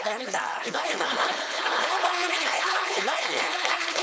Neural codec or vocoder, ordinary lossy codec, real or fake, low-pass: codec, 16 kHz, 4.8 kbps, FACodec; none; fake; none